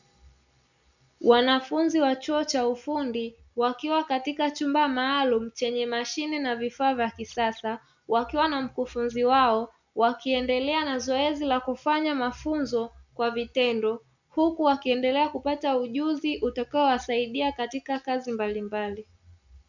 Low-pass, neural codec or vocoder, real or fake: 7.2 kHz; none; real